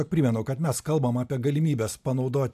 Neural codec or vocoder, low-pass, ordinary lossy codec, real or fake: none; 14.4 kHz; AAC, 96 kbps; real